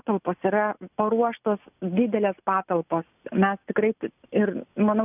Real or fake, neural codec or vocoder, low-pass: real; none; 3.6 kHz